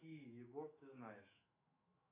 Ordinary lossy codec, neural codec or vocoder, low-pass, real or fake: AAC, 24 kbps; autoencoder, 48 kHz, 128 numbers a frame, DAC-VAE, trained on Japanese speech; 3.6 kHz; fake